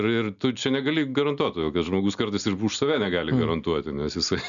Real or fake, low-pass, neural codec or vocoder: real; 7.2 kHz; none